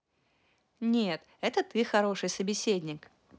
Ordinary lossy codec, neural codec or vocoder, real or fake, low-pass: none; none; real; none